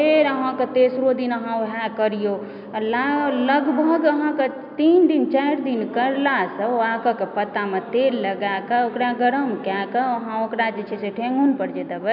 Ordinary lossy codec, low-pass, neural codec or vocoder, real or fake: none; 5.4 kHz; none; real